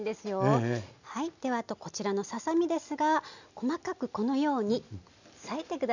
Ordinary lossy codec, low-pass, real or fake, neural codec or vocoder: none; 7.2 kHz; real; none